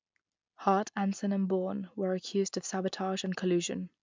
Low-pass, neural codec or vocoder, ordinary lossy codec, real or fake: 7.2 kHz; none; MP3, 64 kbps; real